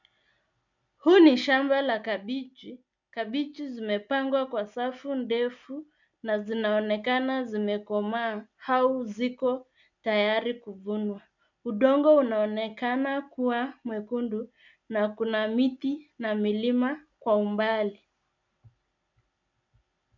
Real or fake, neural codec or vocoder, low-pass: real; none; 7.2 kHz